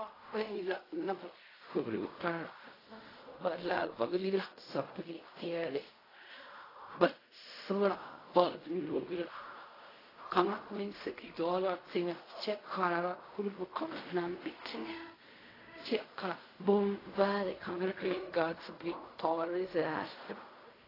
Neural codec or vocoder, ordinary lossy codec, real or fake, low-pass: codec, 16 kHz in and 24 kHz out, 0.4 kbps, LongCat-Audio-Codec, fine tuned four codebook decoder; AAC, 24 kbps; fake; 5.4 kHz